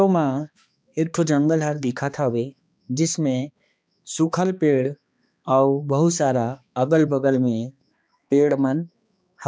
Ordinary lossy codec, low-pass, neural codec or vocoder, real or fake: none; none; codec, 16 kHz, 2 kbps, X-Codec, HuBERT features, trained on balanced general audio; fake